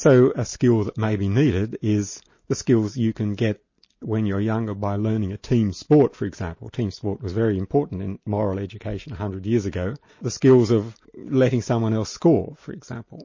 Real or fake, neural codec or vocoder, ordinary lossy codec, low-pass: real; none; MP3, 32 kbps; 7.2 kHz